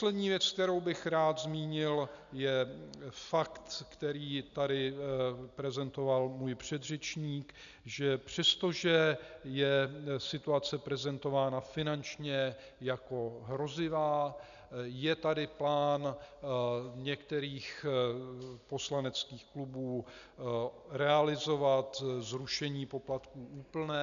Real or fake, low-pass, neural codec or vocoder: real; 7.2 kHz; none